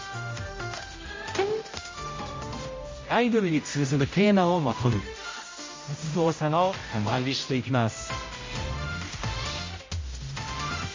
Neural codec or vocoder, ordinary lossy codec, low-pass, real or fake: codec, 16 kHz, 0.5 kbps, X-Codec, HuBERT features, trained on general audio; MP3, 32 kbps; 7.2 kHz; fake